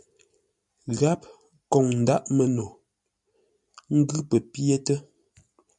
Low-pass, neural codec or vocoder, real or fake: 10.8 kHz; none; real